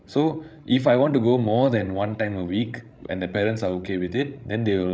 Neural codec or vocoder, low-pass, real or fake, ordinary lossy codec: codec, 16 kHz, 16 kbps, FreqCodec, larger model; none; fake; none